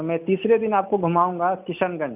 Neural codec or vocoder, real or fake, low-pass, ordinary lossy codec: none; real; 3.6 kHz; none